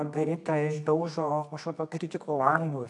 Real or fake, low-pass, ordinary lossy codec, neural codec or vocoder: fake; 10.8 kHz; AAC, 64 kbps; codec, 24 kHz, 0.9 kbps, WavTokenizer, medium music audio release